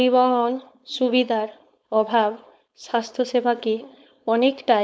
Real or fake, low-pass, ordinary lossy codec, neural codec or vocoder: fake; none; none; codec, 16 kHz, 4.8 kbps, FACodec